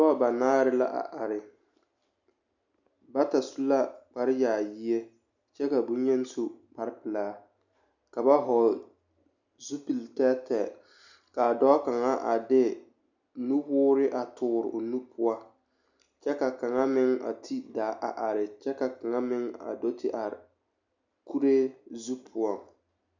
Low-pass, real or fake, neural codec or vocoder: 7.2 kHz; real; none